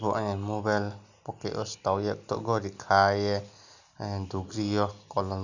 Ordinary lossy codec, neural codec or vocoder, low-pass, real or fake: none; none; 7.2 kHz; real